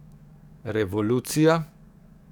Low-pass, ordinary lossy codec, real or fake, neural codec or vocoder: 19.8 kHz; none; fake; codec, 44.1 kHz, 7.8 kbps, DAC